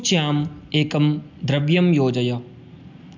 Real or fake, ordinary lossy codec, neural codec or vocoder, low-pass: real; none; none; 7.2 kHz